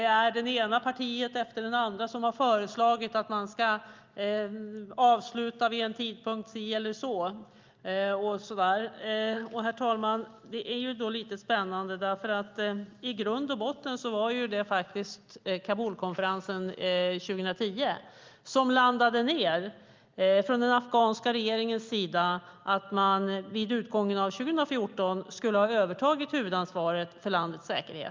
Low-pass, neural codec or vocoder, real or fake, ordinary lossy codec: 7.2 kHz; none; real; Opus, 24 kbps